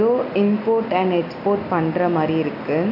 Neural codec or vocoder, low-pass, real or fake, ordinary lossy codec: none; 5.4 kHz; real; AAC, 48 kbps